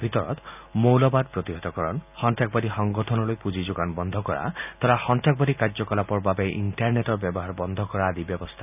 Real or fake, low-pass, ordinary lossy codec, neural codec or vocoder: real; 3.6 kHz; none; none